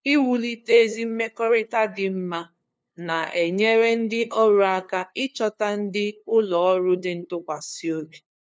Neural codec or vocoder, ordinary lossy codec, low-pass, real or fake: codec, 16 kHz, 2 kbps, FunCodec, trained on LibriTTS, 25 frames a second; none; none; fake